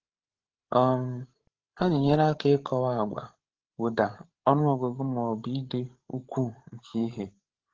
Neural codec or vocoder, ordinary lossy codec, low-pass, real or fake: codec, 16 kHz, 8 kbps, FreqCodec, larger model; Opus, 16 kbps; 7.2 kHz; fake